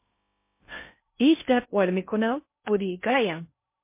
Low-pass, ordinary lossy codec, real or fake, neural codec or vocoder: 3.6 kHz; MP3, 24 kbps; fake; codec, 16 kHz in and 24 kHz out, 0.6 kbps, FocalCodec, streaming, 2048 codes